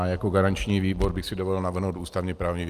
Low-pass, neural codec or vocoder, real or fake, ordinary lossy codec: 14.4 kHz; none; real; Opus, 32 kbps